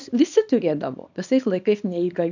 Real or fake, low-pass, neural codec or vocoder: fake; 7.2 kHz; codec, 24 kHz, 0.9 kbps, WavTokenizer, small release